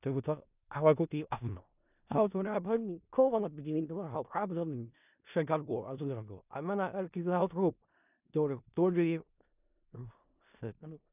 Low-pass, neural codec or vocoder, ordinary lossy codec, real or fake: 3.6 kHz; codec, 16 kHz in and 24 kHz out, 0.4 kbps, LongCat-Audio-Codec, four codebook decoder; none; fake